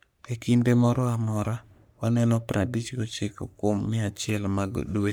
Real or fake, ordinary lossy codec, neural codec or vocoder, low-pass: fake; none; codec, 44.1 kHz, 3.4 kbps, Pupu-Codec; none